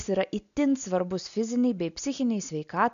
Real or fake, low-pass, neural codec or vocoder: real; 7.2 kHz; none